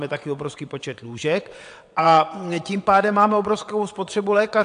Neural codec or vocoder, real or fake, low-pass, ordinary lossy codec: vocoder, 22.05 kHz, 80 mel bands, WaveNeXt; fake; 9.9 kHz; AAC, 64 kbps